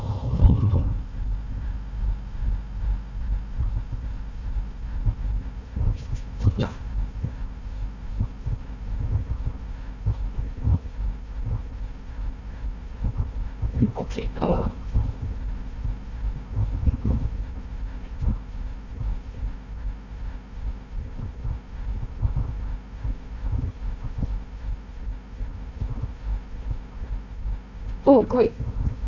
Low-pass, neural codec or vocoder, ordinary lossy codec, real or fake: 7.2 kHz; codec, 16 kHz, 1 kbps, FunCodec, trained on Chinese and English, 50 frames a second; none; fake